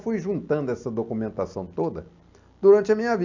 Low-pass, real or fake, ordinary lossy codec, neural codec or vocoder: 7.2 kHz; real; MP3, 64 kbps; none